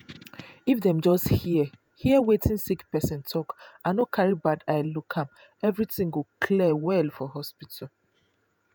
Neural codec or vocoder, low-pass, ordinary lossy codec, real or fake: vocoder, 48 kHz, 128 mel bands, Vocos; none; none; fake